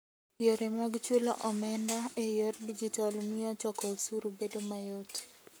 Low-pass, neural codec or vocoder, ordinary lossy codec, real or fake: none; codec, 44.1 kHz, 7.8 kbps, Pupu-Codec; none; fake